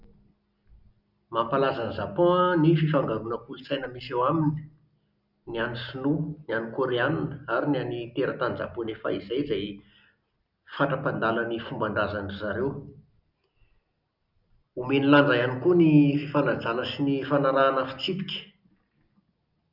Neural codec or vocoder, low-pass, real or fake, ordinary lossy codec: none; 5.4 kHz; real; none